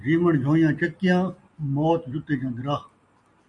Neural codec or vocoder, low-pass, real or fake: none; 10.8 kHz; real